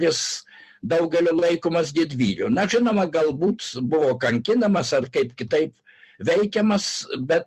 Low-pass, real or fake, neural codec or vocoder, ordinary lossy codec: 14.4 kHz; fake; vocoder, 44.1 kHz, 128 mel bands every 256 samples, BigVGAN v2; Opus, 64 kbps